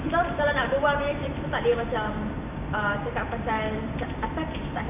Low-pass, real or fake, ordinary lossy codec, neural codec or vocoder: 3.6 kHz; fake; none; vocoder, 44.1 kHz, 128 mel bands every 512 samples, BigVGAN v2